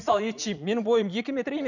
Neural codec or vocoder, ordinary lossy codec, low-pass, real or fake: vocoder, 44.1 kHz, 80 mel bands, Vocos; none; 7.2 kHz; fake